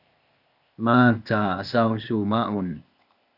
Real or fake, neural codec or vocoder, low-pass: fake; codec, 16 kHz, 0.8 kbps, ZipCodec; 5.4 kHz